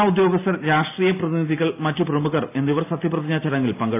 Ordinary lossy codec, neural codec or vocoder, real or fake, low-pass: AAC, 24 kbps; none; real; 3.6 kHz